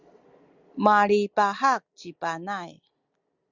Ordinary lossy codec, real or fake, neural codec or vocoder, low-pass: Opus, 64 kbps; real; none; 7.2 kHz